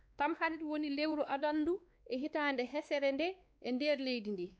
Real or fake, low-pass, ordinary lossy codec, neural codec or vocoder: fake; none; none; codec, 16 kHz, 2 kbps, X-Codec, WavLM features, trained on Multilingual LibriSpeech